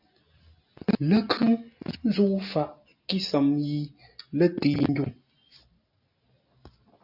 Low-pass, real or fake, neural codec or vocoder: 5.4 kHz; real; none